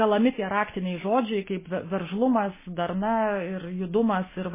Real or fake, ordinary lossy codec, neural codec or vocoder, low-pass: real; MP3, 16 kbps; none; 3.6 kHz